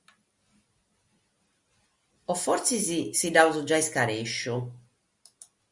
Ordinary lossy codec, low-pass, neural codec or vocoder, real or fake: Opus, 64 kbps; 10.8 kHz; none; real